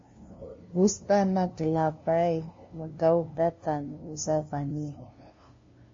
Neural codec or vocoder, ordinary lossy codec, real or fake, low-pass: codec, 16 kHz, 0.5 kbps, FunCodec, trained on LibriTTS, 25 frames a second; MP3, 32 kbps; fake; 7.2 kHz